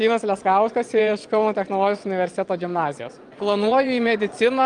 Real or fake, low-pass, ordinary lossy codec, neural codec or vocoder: fake; 9.9 kHz; Opus, 32 kbps; vocoder, 22.05 kHz, 80 mel bands, Vocos